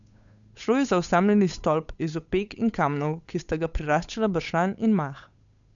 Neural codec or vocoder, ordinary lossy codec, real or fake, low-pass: codec, 16 kHz, 8 kbps, FunCodec, trained on Chinese and English, 25 frames a second; none; fake; 7.2 kHz